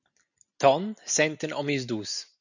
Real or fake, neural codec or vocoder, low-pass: real; none; 7.2 kHz